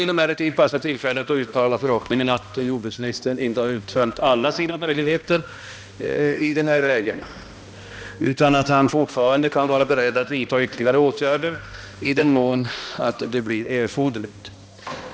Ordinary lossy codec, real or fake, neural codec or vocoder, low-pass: none; fake; codec, 16 kHz, 1 kbps, X-Codec, HuBERT features, trained on balanced general audio; none